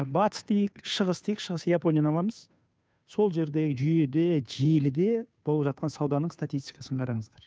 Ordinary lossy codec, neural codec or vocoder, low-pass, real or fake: none; codec, 16 kHz, 2 kbps, FunCodec, trained on Chinese and English, 25 frames a second; none; fake